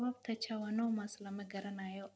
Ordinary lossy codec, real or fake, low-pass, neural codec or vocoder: none; real; none; none